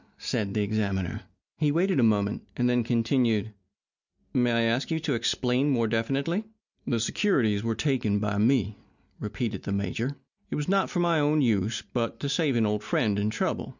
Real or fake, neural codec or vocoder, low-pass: real; none; 7.2 kHz